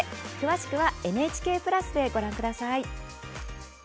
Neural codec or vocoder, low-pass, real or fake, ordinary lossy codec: none; none; real; none